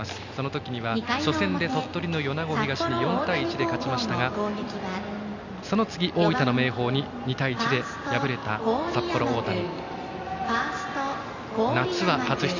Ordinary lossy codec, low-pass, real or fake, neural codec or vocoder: none; 7.2 kHz; real; none